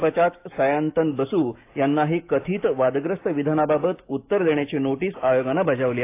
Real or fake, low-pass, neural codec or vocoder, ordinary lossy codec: fake; 3.6 kHz; autoencoder, 48 kHz, 128 numbers a frame, DAC-VAE, trained on Japanese speech; AAC, 24 kbps